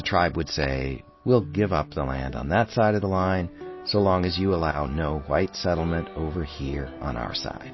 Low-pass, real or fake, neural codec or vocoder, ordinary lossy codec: 7.2 kHz; real; none; MP3, 24 kbps